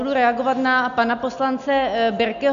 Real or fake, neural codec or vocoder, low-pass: real; none; 7.2 kHz